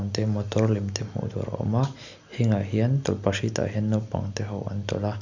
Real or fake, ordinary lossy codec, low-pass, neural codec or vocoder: real; none; 7.2 kHz; none